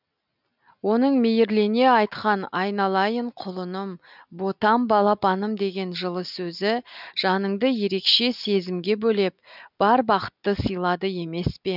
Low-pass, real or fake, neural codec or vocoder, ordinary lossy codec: 5.4 kHz; real; none; none